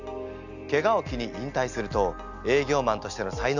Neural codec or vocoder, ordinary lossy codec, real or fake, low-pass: none; none; real; 7.2 kHz